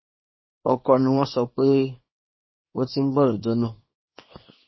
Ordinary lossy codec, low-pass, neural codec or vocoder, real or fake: MP3, 24 kbps; 7.2 kHz; codec, 24 kHz, 0.9 kbps, WavTokenizer, small release; fake